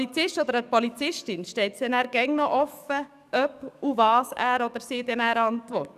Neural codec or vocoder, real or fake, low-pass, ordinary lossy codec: codec, 44.1 kHz, 7.8 kbps, DAC; fake; 14.4 kHz; none